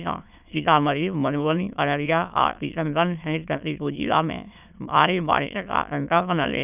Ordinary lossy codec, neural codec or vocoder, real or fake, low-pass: none; autoencoder, 22.05 kHz, a latent of 192 numbers a frame, VITS, trained on many speakers; fake; 3.6 kHz